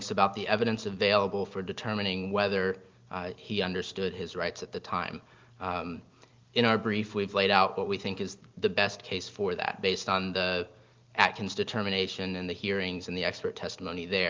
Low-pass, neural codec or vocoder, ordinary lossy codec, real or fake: 7.2 kHz; none; Opus, 32 kbps; real